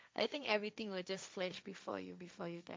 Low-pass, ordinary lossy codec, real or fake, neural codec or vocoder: none; none; fake; codec, 16 kHz, 1.1 kbps, Voila-Tokenizer